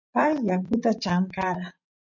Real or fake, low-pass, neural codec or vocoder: fake; 7.2 kHz; vocoder, 44.1 kHz, 80 mel bands, Vocos